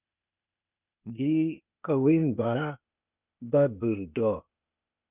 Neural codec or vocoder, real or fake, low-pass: codec, 16 kHz, 0.8 kbps, ZipCodec; fake; 3.6 kHz